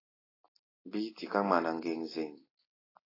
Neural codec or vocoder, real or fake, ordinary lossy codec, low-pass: none; real; AAC, 24 kbps; 5.4 kHz